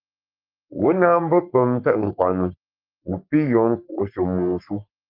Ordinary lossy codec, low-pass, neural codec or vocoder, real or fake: Opus, 32 kbps; 5.4 kHz; codec, 44.1 kHz, 3.4 kbps, Pupu-Codec; fake